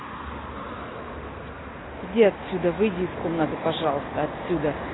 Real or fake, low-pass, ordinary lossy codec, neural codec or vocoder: real; 7.2 kHz; AAC, 16 kbps; none